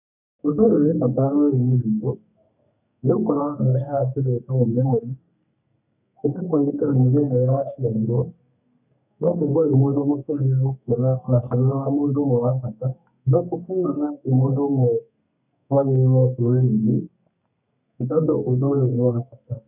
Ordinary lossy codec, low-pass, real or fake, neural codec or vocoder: none; 3.6 kHz; fake; codec, 32 kHz, 1.9 kbps, SNAC